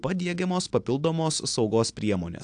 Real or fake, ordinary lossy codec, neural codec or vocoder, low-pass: real; AAC, 64 kbps; none; 9.9 kHz